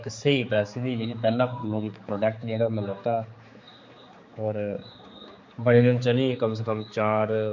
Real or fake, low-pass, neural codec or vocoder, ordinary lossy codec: fake; 7.2 kHz; codec, 16 kHz, 4 kbps, X-Codec, HuBERT features, trained on general audio; MP3, 48 kbps